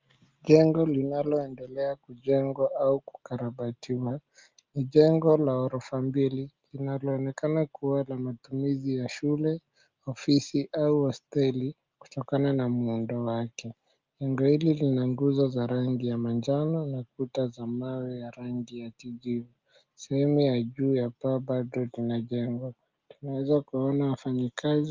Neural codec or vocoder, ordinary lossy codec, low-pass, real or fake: none; Opus, 24 kbps; 7.2 kHz; real